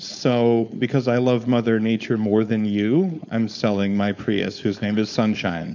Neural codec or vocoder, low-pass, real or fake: codec, 16 kHz, 4.8 kbps, FACodec; 7.2 kHz; fake